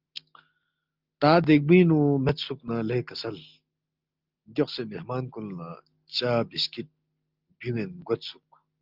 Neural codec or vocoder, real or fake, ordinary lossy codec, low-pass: none; real; Opus, 32 kbps; 5.4 kHz